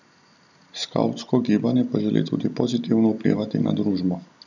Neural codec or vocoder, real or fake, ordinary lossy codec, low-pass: none; real; none; 7.2 kHz